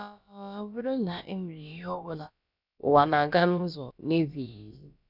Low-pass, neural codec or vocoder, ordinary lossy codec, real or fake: 5.4 kHz; codec, 16 kHz, about 1 kbps, DyCAST, with the encoder's durations; none; fake